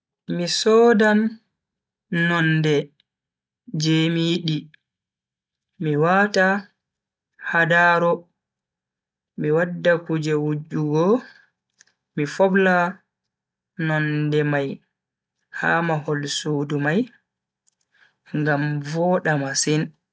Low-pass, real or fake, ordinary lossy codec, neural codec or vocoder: none; real; none; none